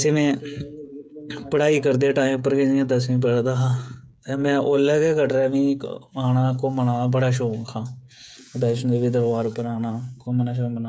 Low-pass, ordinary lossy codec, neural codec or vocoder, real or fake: none; none; codec, 16 kHz, 16 kbps, FreqCodec, smaller model; fake